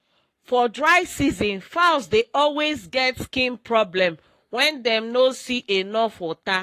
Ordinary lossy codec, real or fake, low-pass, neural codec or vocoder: AAC, 48 kbps; fake; 14.4 kHz; codec, 44.1 kHz, 7.8 kbps, Pupu-Codec